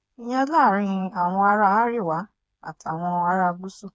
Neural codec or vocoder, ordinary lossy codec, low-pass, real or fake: codec, 16 kHz, 4 kbps, FreqCodec, smaller model; none; none; fake